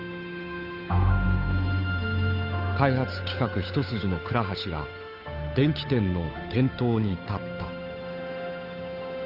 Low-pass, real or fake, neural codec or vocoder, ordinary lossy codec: 5.4 kHz; fake; codec, 16 kHz, 8 kbps, FunCodec, trained on Chinese and English, 25 frames a second; none